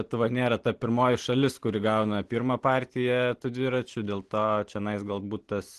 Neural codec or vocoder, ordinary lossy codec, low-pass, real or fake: none; Opus, 16 kbps; 10.8 kHz; real